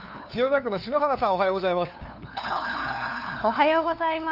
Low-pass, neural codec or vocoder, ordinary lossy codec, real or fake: 5.4 kHz; codec, 16 kHz, 2 kbps, FunCodec, trained on LibriTTS, 25 frames a second; none; fake